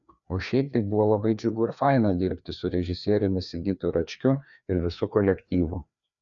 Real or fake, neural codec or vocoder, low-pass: fake; codec, 16 kHz, 2 kbps, FreqCodec, larger model; 7.2 kHz